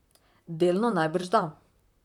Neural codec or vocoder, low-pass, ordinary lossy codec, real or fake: vocoder, 44.1 kHz, 128 mel bands, Pupu-Vocoder; 19.8 kHz; none; fake